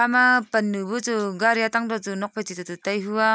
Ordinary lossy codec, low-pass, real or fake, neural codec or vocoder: none; none; real; none